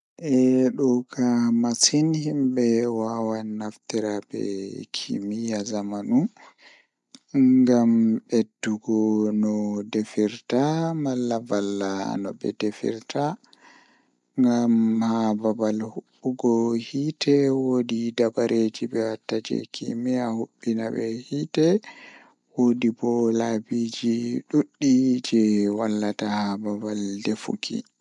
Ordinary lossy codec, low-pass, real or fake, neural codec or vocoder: MP3, 96 kbps; 10.8 kHz; real; none